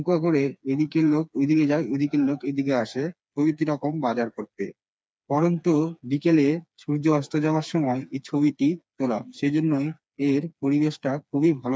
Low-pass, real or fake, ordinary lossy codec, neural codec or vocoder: none; fake; none; codec, 16 kHz, 4 kbps, FreqCodec, smaller model